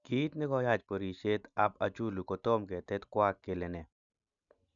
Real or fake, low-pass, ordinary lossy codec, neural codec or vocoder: real; 7.2 kHz; none; none